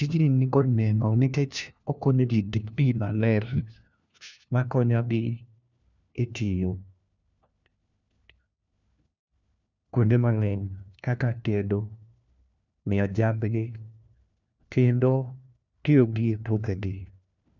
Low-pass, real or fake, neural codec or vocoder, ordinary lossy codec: 7.2 kHz; fake; codec, 16 kHz, 1 kbps, FunCodec, trained on LibriTTS, 50 frames a second; none